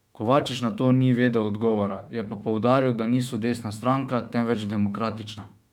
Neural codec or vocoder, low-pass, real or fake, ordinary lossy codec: autoencoder, 48 kHz, 32 numbers a frame, DAC-VAE, trained on Japanese speech; 19.8 kHz; fake; none